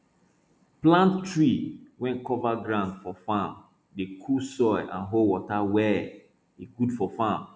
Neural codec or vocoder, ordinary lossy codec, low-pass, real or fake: none; none; none; real